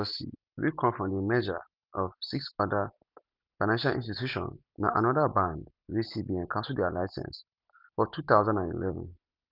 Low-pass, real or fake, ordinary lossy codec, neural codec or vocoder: 5.4 kHz; real; none; none